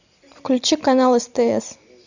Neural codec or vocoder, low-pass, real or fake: none; 7.2 kHz; real